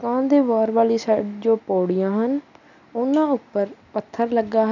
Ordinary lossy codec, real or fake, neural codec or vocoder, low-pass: none; real; none; 7.2 kHz